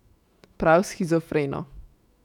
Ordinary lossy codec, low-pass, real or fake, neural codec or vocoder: none; 19.8 kHz; fake; autoencoder, 48 kHz, 128 numbers a frame, DAC-VAE, trained on Japanese speech